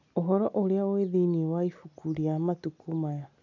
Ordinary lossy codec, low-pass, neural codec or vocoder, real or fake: none; 7.2 kHz; none; real